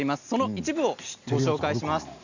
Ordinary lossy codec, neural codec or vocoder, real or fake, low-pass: none; none; real; 7.2 kHz